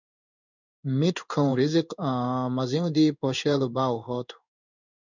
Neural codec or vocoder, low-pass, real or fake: codec, 16 kHz in and 24 kHz out, 1 kbps, XY-Tokenizer; 7.2 kHz; fake